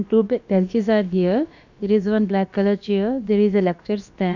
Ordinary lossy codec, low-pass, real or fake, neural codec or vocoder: none; 7.2 kHz; fake; codec, 16 kHz, about 1 kbps, DyCAST, with the encoder's durations